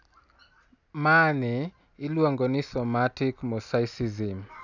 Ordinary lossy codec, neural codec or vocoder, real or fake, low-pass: none; none; real; 7.2 kHz